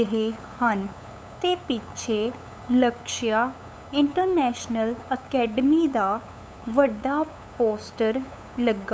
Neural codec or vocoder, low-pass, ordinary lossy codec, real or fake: codec, 16 kHz, 8 kbps, FunCodec, trained on LibriTTS, 25 frames a second; none; none; fake